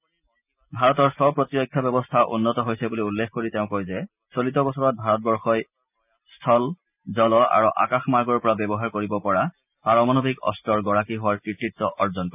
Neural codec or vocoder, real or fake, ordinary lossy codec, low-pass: none; real; none; 3.6 kHz